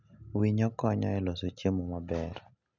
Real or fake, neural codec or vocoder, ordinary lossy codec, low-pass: real; none; none; 7.2 kHz